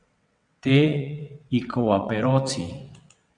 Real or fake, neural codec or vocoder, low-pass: fake; vocoder, 22.05 kHz, 80 mel bands, WaveNeXt; 9.9 kHz